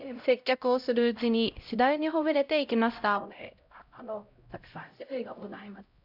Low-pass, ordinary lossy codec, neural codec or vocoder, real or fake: 5.4 kHz; none; codec, 16 kHz, 0.5 kbps, X-Codec, HuBERT features, trained on LibriSpeech; fake